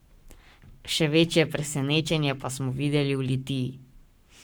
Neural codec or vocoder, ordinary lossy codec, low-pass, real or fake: codec, 44.1 kHz, 7.8 kbps, Pupu-Codec; none; none; fake